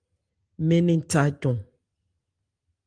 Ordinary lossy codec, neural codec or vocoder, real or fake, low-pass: Opus, 24 kbps; none; real; 9.9 kHz